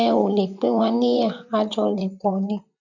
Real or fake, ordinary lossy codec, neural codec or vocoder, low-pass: fake; none; vocoder, 44.1 kHz, 128 mel bands, Pupu-Vocoder; 7.2 kHz